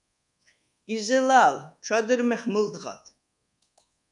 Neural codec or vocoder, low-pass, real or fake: codec, 24 kHz, 1.2 kbps, DualCodec; 10.8 kHz; fake